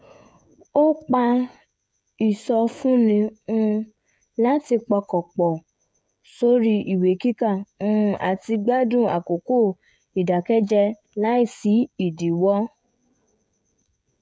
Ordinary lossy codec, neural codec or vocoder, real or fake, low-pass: none; codec, 16 kHz, 16 kbps, FreqCodec, smaller model; fake; none